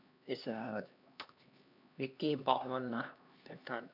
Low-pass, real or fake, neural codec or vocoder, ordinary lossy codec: 5.4 kHz; fake; codec, 16 kHz, 2 kbps, X-Codec, HuBERT features, trained on LibriSpeech; none